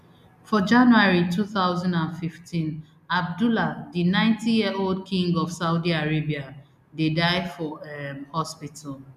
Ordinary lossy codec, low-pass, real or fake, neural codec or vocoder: none; 14.4 kHz; real; none